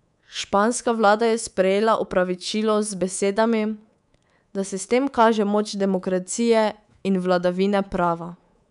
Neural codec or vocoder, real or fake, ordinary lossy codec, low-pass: codec, 24 kHz, 3.1 kbps, DualCodec; fake; none; 10.8 kHz